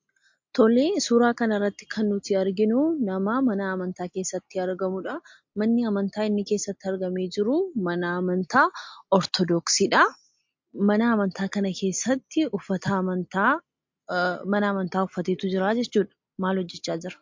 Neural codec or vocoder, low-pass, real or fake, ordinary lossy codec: none; 7.2 kHz; real; MP3, 48 kbps